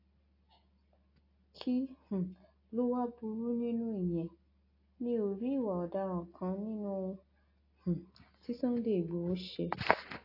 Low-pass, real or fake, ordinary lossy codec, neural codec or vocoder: 5.4 kHz; real; AAC, 32 kbps; none